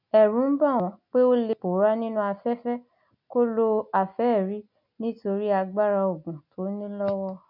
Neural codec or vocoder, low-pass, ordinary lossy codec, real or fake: none; 5.4 kHz; none; real